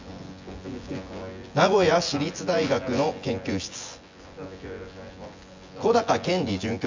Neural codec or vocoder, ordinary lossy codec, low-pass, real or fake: vocoder, 24 kHz, 100 mel bands, Vocos; MP3, 64 kbps; 7.2 kHz; fake